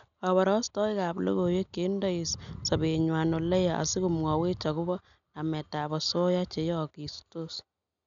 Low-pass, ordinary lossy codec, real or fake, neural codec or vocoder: 7.2 kHz; none; real; none